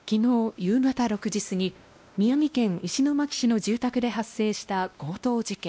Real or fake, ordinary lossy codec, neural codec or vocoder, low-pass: fake; none; codec, 16 kHz, 1 kbps, X-Codec, WavLM features, trained on Multilingual LibriSpeech; none